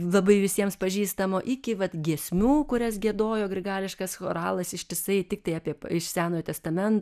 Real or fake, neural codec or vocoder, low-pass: real; none; 14.4 kHz